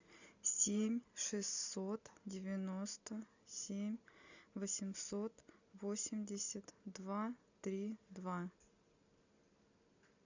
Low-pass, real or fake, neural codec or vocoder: 7.2 kHz; real; none